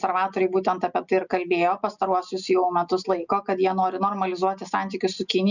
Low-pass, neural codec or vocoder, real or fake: 7.2 kHz; none; real